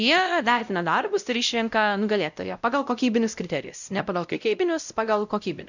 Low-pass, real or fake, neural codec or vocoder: 7.2 kHz; fake; codec, 16 kHz, 0.5 kbps, X-Codec, WavLM features, trained on Multilingual LibriSpeech